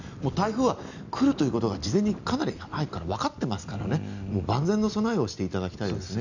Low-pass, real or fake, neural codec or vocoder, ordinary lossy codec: 7.2 kHz; real; none; none